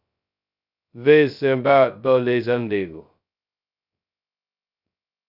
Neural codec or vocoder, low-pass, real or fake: codec, 16 kHz, 0.2 kbps, FocalCodec; 5.4 kHz; fake